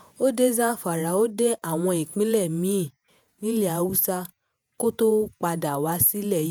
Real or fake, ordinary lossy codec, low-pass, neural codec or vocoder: fake; none; none; vocoder, 48 kHz, 128 mel bands, Vocos